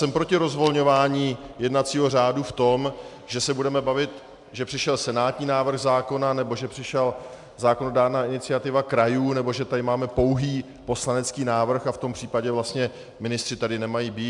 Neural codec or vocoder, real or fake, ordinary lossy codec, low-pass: none; real; MP3, 96 kbps; 10.8 kHz